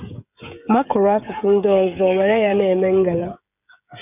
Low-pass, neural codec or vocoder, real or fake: 3.6 kHz; codec, 16 kHz, 8 kbps, FreqCodec, smaller model; fake